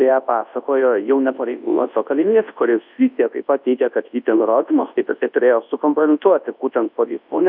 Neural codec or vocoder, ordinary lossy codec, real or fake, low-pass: codec, 24 kHz, 0.9 kbps, WavTokenizer, large speech release; AAC, 48 kbps; fake; 5.4 kHz